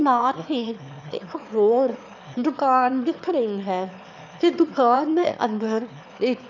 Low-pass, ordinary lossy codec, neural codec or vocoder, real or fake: 7.2 kHz; none; autoencoder, 22.05 kHz, a latent of 192 numbers a frame, VITS, trained on one speaker; fake